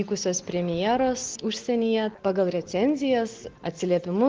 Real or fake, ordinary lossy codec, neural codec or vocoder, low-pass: real; Opus, 16 kbps; none; 7.2 kHz